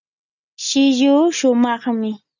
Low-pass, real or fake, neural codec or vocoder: 7.2 kHz; real; none